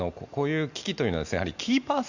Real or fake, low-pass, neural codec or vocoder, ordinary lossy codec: real; 7.2 kHz; none; Opus, 64 kbps